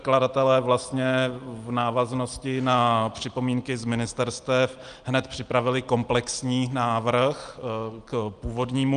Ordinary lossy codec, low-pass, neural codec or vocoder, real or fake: Opus, 32 kbps; 9.9 kHz; none; real